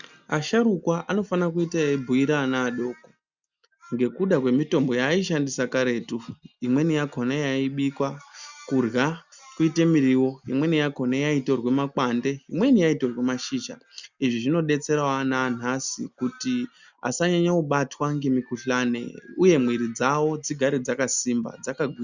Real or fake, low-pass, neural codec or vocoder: real; 7.2 kHz; none